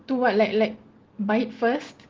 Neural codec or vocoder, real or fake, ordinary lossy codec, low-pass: none; real; Opus, 16 kbps; 7.2 kHz